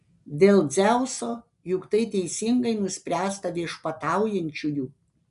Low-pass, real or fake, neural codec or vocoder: 10.8 kHz; real; none